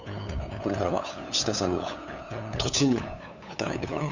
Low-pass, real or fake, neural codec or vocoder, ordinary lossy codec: 7.2 kHz; fake; codec, 16 kHz, 8 kbps, FunCodec, trained on LibriTTS, 25 frames a second; none